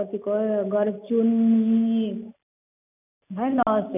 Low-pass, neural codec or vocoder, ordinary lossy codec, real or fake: 3.6 kHz; none; none; real